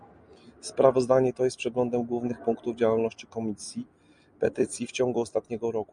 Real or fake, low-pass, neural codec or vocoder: real; 9.9 kHz; none